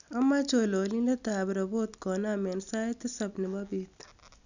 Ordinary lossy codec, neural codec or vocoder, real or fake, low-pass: none; none; real; 7.2 kHz